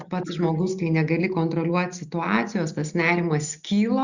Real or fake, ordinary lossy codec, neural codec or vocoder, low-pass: real; Opus, 64 kbps; none; 7.2 kHz